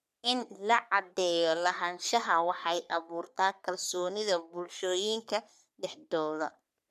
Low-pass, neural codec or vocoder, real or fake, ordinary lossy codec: 14.4 kHz; codec, 44.1 kHz, 3.4 kbps, Pupu-Codec; fake; none